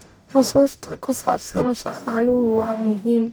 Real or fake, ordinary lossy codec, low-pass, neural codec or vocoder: fake; none; none; codec, 44.1 kHz, 0.9 kbps, DAC